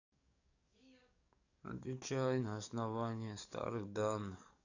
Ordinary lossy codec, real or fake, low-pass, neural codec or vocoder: none; fake; 7.2 kHz; codec, 44.1 kHz, 7.8 kbps, DAC